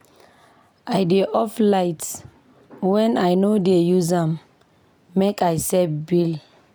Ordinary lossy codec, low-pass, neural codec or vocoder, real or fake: none; none; none; real